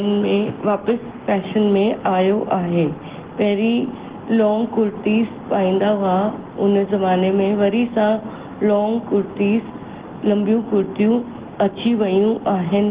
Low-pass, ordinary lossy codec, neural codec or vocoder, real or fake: 3.6 kHz; Opus, 16 kbps; none; real